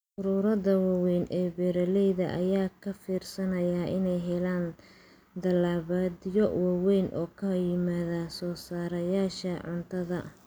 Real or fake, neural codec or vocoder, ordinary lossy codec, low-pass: real; none; none; none